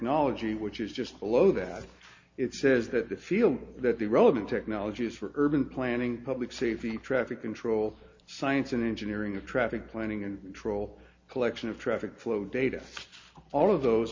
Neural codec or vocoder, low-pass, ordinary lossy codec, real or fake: none; 7.2 kHz; MP3, 48 kbps; real